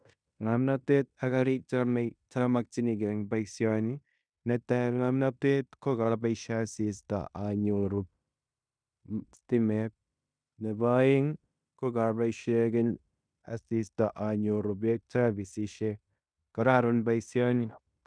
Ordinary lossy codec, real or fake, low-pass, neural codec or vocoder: none; fake; 9.9 kHz; codec, 16 kHz in and 24 kHz out, 0.9 kbps, LongCat-Audio-Codec, fine tuned four codebook decoder